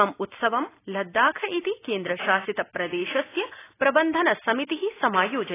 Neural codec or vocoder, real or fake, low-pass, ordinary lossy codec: none; real; 3.6 kHz; AAC, 16 kbps